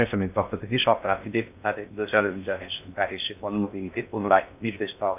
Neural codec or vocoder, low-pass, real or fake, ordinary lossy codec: codec, 16 kHz in and 24 kHz out, 0.6 kbps, FocalCodec, streaming, 2048 codes; 3.6 kHz; fake; none